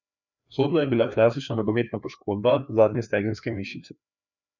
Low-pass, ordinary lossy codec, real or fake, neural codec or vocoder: 7.2 kHz; none; fake; codec, 16 kHz, 2 kbps, FreqCodec, larger model